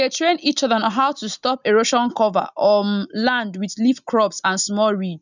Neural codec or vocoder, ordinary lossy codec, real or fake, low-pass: none; none; real; 7.2 kHz